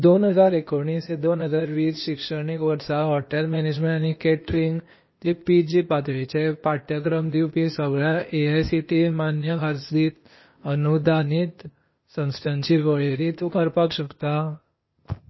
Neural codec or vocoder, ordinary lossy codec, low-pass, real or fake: codec, 16 kHz, 0.8 kbps, ZipCodec; MP3, 24 kbps; 7.2 kHz; fake